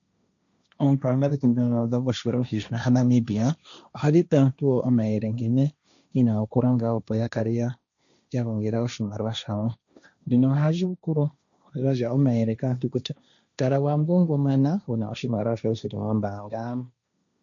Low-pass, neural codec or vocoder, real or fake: 7.2 kHz; codec, 16 kHz, 1.1 kbps, Voila-Tokenizer; fake